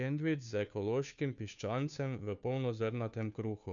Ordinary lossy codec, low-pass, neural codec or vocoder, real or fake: none; 7.2 kHz; codec, 16 kHz, 2 kbps, FunCodec, trained on Chinese and English, 25 frames a second; fake